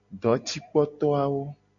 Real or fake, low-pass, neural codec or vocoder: real; 7.2 kHz; none